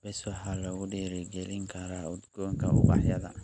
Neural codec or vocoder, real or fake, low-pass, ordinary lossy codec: none; real; 9.9 kHz; none